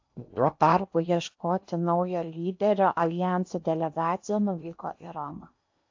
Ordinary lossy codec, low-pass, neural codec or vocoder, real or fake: AAC, 48 kbps; 7.2 kHz; codec, 16 kHz in and 24 kHz out, 0.8 kbps, FocalCodec, streaming, 65536 codes; fake